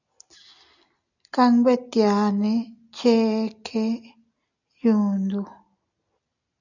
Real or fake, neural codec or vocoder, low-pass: real; none; 7.2 kHz